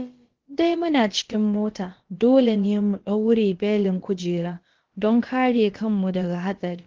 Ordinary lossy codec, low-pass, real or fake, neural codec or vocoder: Opus, 16 kbps; 7.2 kHz; fake; codec, 16 kHz, about 1 kbps, DyCAST, with the encoder's durations